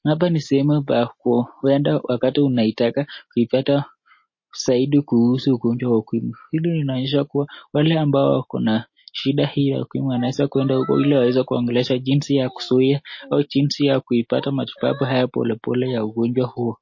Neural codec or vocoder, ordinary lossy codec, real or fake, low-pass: none; MP3, 32 kbps; real; 7.2 kHz